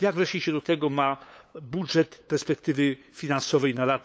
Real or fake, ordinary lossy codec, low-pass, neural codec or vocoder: fake; none; none; codec, 16 kHz, 8 kbps, FunCodec, trained on LibriTTS, 25 frames a second